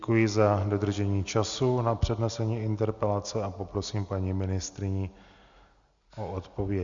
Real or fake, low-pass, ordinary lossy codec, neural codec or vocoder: real; 7.2 kHz; Opus, 64 kbps; none